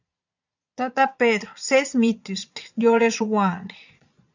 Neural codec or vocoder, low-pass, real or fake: vocoder, 22.05 kHz, 80 mel bands, Vocos; 7.2 kHz; fake